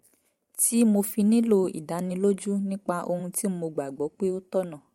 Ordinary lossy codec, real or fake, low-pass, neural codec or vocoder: MP3, 64 kbps; fake; 19.8 kHz; vocoder, 44.1 kHz, 128 mel bands every 512 samples, BigVGAN v2